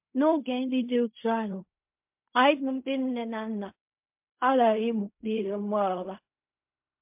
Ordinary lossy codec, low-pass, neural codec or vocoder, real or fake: MP3, 32 kbps; 3.6 kHz; codec, 16 kHz in and 24 kHz out, 0.4 kbps, LongCat-Audio-Codec, fine tuned four codebook decoder; fake